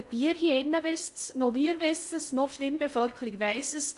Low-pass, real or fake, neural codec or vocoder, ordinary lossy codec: 10.8 kHz; fake; codec, 16 kHz in and 24 kHz out, 0.6 kbps, FocalCodec, streaming, 2048 codes; AAC, 64 kbps